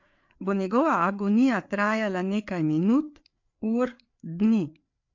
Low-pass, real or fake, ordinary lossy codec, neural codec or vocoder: 7.2 kHz; fake; MP3, 48 kbps; codec, 16 kHz, 4 kbps, FreqCodec, larger model